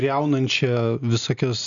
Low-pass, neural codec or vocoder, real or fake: 7.2 kHz; none; real